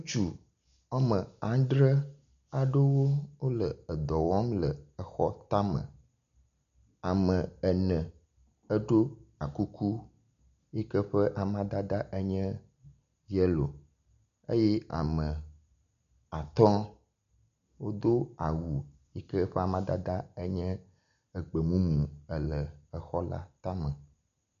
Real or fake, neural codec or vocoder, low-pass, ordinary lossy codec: real; none; 7.2 kHz; MP3, 96 kbps